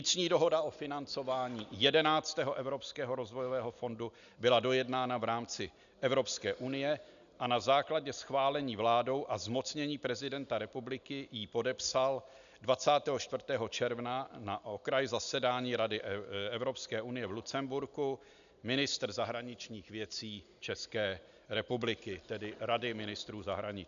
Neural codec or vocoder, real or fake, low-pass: none; real; 7.2 kHz